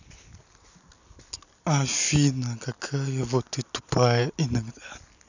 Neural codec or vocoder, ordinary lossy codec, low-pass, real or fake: none; none; 7.2 kHz; real